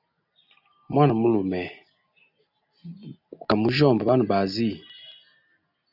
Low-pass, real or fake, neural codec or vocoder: 5.4 kHz; real; none